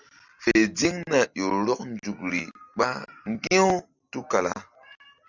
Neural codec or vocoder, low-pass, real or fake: none; 7.2 kHz; real